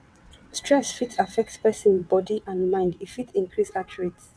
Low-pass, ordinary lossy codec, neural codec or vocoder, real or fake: none; none; vocoder, 22.05 kHz, 80 mel bands, WaveNeXt; fake